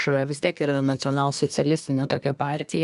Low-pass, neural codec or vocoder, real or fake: 10.8 kHz; codec, 24 kHz, 1 kbps, SNAC; fake